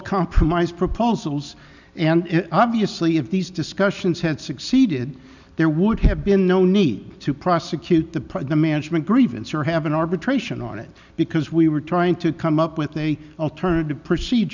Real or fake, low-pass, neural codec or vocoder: real; 7.2 kHz; none